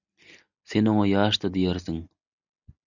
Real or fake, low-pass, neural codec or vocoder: real; 7.2 kHz; none